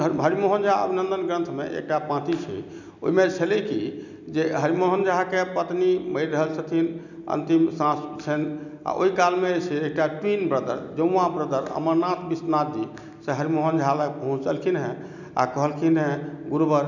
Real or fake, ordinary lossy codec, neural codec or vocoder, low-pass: real; none; none; 7.2 kHz